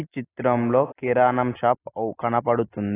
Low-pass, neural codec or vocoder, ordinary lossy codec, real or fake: 3.6 kHz; none; AAC, 16 kbps; real